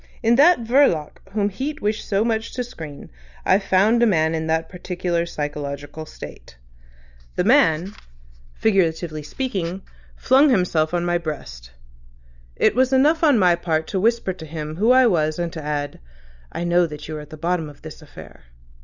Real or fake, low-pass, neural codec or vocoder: real; 7.2 kHz; none